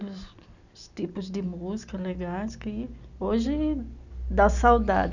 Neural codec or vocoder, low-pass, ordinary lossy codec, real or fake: none; 7.2 kHz; none; real